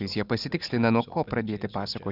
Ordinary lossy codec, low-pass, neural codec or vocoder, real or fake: Opus, 64 kbps; 5.4 kHz; none; real